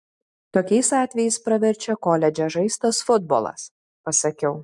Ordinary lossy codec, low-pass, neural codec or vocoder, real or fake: MP3, 64 kbps; 10.8 kHz; none; real